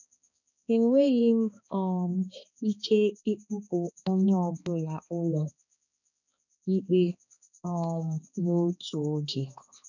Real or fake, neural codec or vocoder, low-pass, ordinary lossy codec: fake; codec, 16 kHz, 2 kbps, X-Codec, HuBERT features, trained on balanced general audio; 7.2 kHz; none